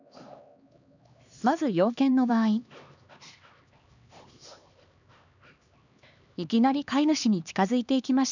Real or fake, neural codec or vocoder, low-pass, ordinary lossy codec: fake; codec, 16 kHz, 2 kbps, X-Codec, HuBERT features, trained on LibriSpeech; 7.2 kHz; none